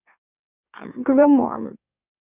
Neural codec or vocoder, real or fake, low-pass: autoencoder, 44.1 kHz, a latent of 192 numbers a frame, MeloTTS; fake; 3.6 kHz